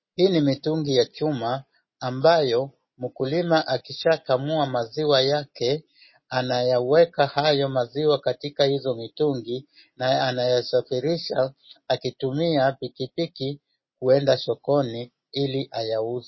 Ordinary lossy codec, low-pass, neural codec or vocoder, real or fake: MP3, 24 kbps; 7.2 kHz; none; real